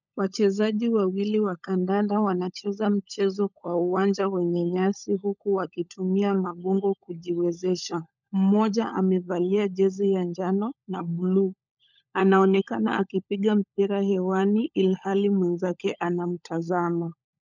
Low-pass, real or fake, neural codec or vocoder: 7.2 kHz; fake; codec, 16 kHz, 16 kbps, FunCodec, trained on LibriTTS, 50 frames a second